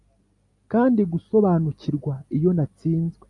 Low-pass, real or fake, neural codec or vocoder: 10.8 kHz; real; none